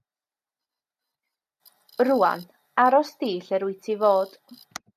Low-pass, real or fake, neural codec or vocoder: 14.4 kHz; real; none